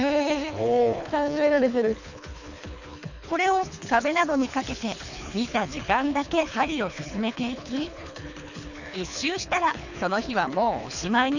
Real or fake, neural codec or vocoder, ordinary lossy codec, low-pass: fake; codec, 24 kHz, 3 kbps, HILCodec; none; 7.2 kHz